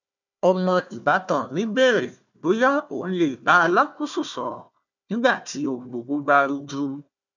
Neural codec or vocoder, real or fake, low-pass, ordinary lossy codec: codec, 16 kHz, 1 kbps, FunCodec, trained on Chinese and English, 50 frames a second; fake; 7.2 kHz; none